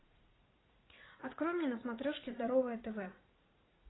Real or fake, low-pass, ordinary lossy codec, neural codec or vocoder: fake; 7.2 kHz; AAC, 16 kbps; vocoder, 44.1 kHz, 80 mel bands, Vocos